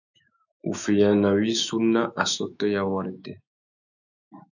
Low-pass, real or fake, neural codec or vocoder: 7.2 kHz; fake; autoencoder, 48 kHz, 128 numbers a frame, DAC-VAE, trained on Japanese speech